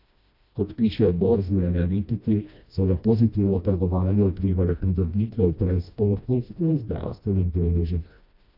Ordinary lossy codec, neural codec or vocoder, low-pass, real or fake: AAC, 48 kbps; codec, 16 kHz, 1 kbps, FreqCodec, smaller model; 5.4 kHz; fake